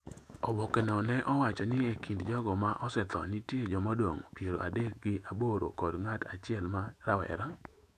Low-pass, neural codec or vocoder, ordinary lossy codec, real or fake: 14.4 kHz; autoencoder, 48 kHz, 128 numbers a frame, DAC-VAE, trained on Japanese speech; none; fake